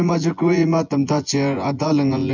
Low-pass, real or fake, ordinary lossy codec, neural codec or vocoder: 7.2 kHz; fake; MP3, 64 kbps; vocoder, 24 kHz, 100 mel bands, Vocos